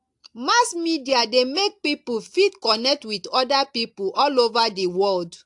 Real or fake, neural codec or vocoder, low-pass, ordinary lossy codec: real; none; 10.8 kHz; AAC, 64 kbps